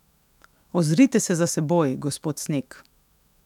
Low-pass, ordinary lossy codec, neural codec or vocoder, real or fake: 19.8 kHz; none; autoencoder, 48 kHz, 128 numbers a frame, DAC-VAE, trained on Japanese speech; fake